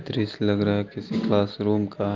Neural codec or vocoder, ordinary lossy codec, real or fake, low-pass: none; Opus, 32 kbps; real; 7.2 kHz